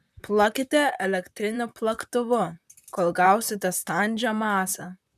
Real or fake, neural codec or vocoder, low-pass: fake; vocoder, 44.1 kHz, 128 mel bands, Pupu-Vocoder; 14.4 kHz